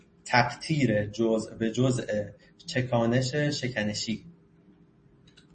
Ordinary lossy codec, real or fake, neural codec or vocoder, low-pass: MP3, 32 kbps; real; none; 9.9 kHz